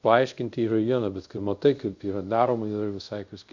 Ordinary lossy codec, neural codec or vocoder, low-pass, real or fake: Opus, 64 kbps; codec, 24 kHz, 0.5 kbps, DualCodec; 7.2 kHz; fake